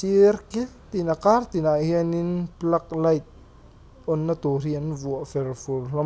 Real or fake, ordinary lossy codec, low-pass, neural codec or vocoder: real; none; none; none